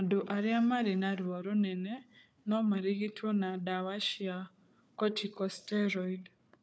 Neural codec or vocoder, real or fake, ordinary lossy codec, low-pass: codec, 16 kHz, 4 kbps, FunCodec, trained on Chinese and English, 50 frames a second; fake; none; none